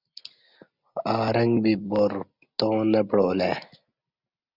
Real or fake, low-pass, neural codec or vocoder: real; 5.4 kHz; none